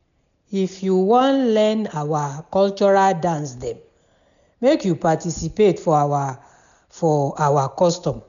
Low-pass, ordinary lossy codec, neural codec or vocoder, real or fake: 7.2 kHz; none; none; real